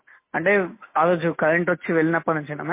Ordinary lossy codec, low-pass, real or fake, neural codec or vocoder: MP3, 24 kbps; 3.6 kHz; real; none